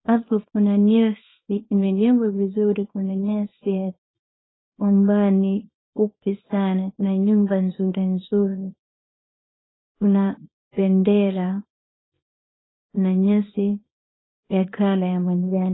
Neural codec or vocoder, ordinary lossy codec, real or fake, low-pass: codec, 24 kHz, 0.9 kbps, WavTokenizer, small release; AAC, 16 kbps; fake; 7.2 kHz